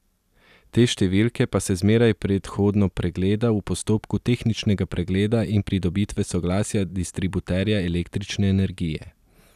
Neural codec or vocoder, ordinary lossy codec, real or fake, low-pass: none; none; real; 14.4 kHz